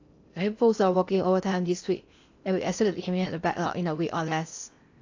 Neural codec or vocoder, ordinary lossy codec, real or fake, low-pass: codec, 16 kHz in and 24 kHz out, 0.8 kbps, FocalCodec, streaming, 65536 codes; AAC, 48 kbps; fake; 7.2 kHz